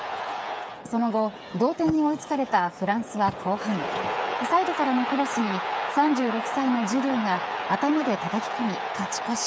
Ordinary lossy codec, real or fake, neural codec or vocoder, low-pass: none; fake; codec, 16 kHz, 8 kbps, FreqCodec, smaller model; none